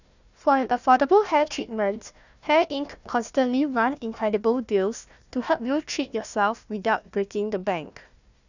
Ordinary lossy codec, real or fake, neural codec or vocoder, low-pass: none; fake; codec, 16 kHz, 1 kbps, FunCodec, trained on Chinese and English, 50 frames a second; 7.2 kHz